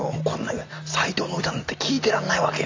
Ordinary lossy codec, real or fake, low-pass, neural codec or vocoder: AAC, 48 kbps; real; 7.2 kHz; none